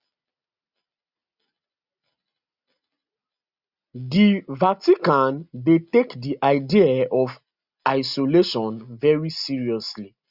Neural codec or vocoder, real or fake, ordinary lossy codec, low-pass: none; real; Opus, 64 kbps; 5.4 kHz